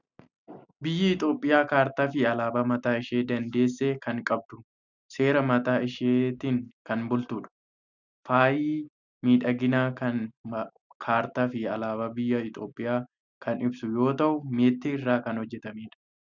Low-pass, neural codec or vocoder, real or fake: 7.2 kHz; none; real